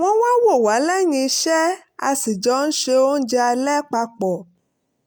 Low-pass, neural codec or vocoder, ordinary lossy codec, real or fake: none; none; none; real